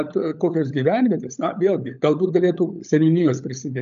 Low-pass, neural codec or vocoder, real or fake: 7.2 kHz; codec, 16 kHz, 16 kbps, FunCodec, trained on LibriTTS, 50 frames a second; fake